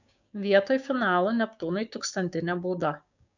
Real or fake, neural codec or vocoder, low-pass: fake; codec, 16 kHz, 6 kbps, DAC; 7.2 kHz